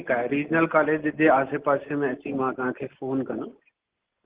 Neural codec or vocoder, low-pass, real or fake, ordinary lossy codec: none; 3.6 kHz; real; Opus, 16 kbps